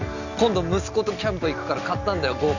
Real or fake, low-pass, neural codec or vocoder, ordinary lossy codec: real; 7.2 kHz; none; none